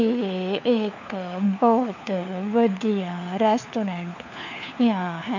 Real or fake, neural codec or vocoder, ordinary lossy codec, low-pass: fake; codec, 16 kHz, 4 kbps, FunCodec, trained on LibriTTS, 50 frames a second; none; 7.2 kHz